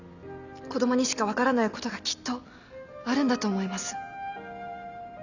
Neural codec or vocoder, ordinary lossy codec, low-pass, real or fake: none; none; 7.2 kHz; real